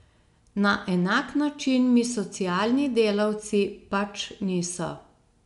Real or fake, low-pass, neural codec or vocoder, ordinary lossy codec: real; 10.8 kHz; none; none